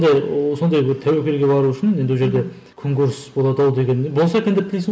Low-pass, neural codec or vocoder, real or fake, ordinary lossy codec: none; none; real; none